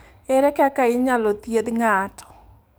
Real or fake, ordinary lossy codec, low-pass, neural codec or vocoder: fake; none; none; codec, 44.1 kHz, 7.8 kbps, DAC